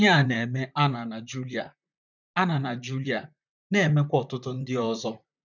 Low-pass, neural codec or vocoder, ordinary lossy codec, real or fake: 7.2 kHz; vocoder, 44.1 kHz, 128 mel bands, Pupu-Vocoder; none; fake